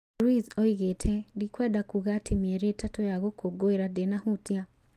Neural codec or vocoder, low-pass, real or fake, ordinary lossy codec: none; 14.4 kHz; real; Opus, 32 kbps